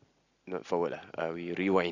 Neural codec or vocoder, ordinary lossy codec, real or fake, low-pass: none; Opus, 64 kbps; real; 7.2 kHz